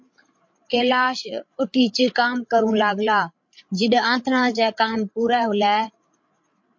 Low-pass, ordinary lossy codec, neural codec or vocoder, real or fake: 7.2 kHz; MP3, 48 kbps; codec, 16 kHz, 8 kbps, FreqCodec, larger model; fake